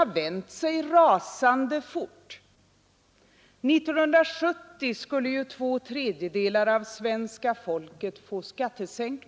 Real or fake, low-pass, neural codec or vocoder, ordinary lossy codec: real; none; none; none